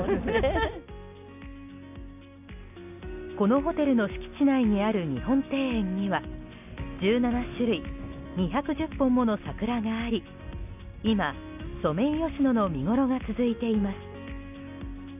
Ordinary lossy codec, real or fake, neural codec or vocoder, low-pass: none; real; none; 3.6 kHz